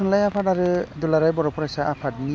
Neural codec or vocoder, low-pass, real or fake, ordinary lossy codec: none; none; real; none